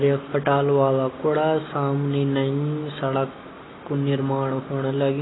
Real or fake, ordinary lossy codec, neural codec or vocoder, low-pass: real; AAC, 16 kbps; none; 7.2 kHz